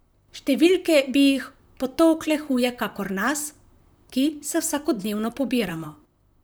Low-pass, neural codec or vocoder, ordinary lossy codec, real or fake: none; vocoder, 44.1 kHz, 128 mel bands, Pupu-Vocoder; none; fake